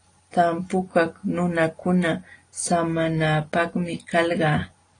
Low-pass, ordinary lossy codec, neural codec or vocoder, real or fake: 9.9 kHz; AAC, 32 kbps; none; real